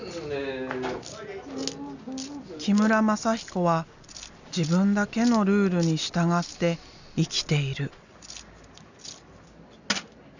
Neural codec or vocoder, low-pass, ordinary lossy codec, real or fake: none; 7.2 kHz; none; real